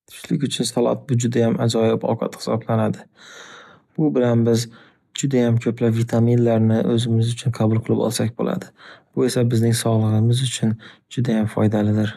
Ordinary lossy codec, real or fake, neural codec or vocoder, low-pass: none; real; none; 14.4 kHz